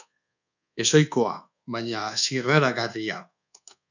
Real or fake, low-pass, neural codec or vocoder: fake; 7.2 kHz; codec, 24 kHz, 1.2 kbps, DualCodec